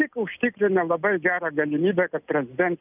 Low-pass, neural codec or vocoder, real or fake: 3.6 kHz; none; real